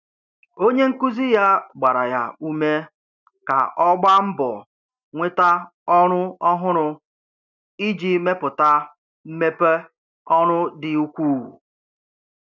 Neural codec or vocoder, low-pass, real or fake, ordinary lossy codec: none; 7.2 kHz; real; none